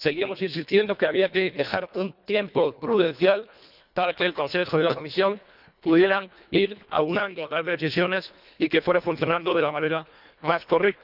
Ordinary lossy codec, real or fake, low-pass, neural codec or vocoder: none; fake; 5.4 kHz; codec, 24 kHz, 1.5 kbps, HILCodec